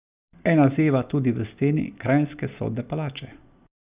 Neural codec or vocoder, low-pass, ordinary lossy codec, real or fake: none; 3.6 kHz; Opus, 64 kbps; real